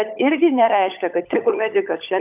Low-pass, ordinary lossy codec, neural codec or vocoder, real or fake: 3.6 kHz; AAC, 32 kbps; codec, 16 kHz, 16 kbps, FunCodec, trained on LibriTTS, 50 frames a second; fake